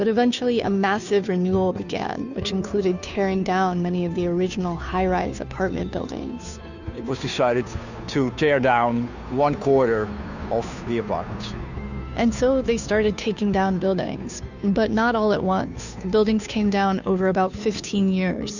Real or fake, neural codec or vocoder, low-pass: fake; codec, 16 kHz, 2 kbps, FunCodec, trained on Chinese and English, 25 frames a second; 7.2 kHz